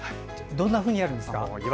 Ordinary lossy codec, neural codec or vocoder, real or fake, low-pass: none; none; real; none